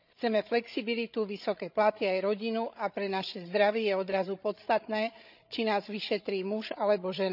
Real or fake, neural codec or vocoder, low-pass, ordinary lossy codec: fake; codec, 16 kHz, 16 kbps, FreqCodec, larger model; 5.4 kHz; none